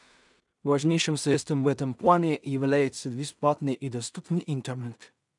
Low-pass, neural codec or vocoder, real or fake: 10.8 kHz; codec, 16 kHz in and 24 kHz out, 0.4 kbps, LongCat-Audio-Codec, two codebook decoder; fake